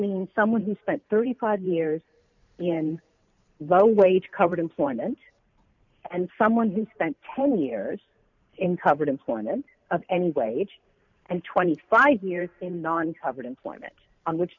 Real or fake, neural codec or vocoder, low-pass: fake; vocoder, 44.1 kHz, 128 mel bands every 512 samples, BigVGAN v2; 7.2 kHz